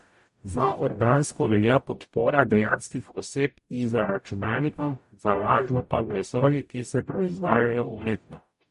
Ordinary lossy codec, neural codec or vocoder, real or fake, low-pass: MP3, 48 kbps; codec, 44.1 kHz, 0.9 kbps, DAC; fake; 14.4 kHz